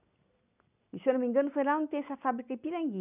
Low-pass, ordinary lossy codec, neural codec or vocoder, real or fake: 3.6 kHz; none; none; real